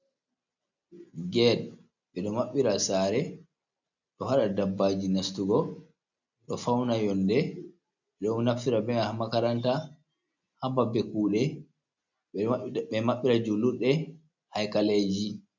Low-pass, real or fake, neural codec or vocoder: 7.2 kHz; real; none